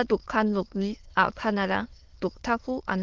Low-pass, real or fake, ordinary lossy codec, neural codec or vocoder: 7.2 kHz; fake; Opus, 16 kbps; autoencoder, 22.05 kHz, a latent of 192 numbers a frame, VITS, trained on many speakers